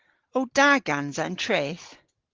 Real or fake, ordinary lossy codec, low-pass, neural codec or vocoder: real; Opus, 16 kbps; 7.2 kHz; none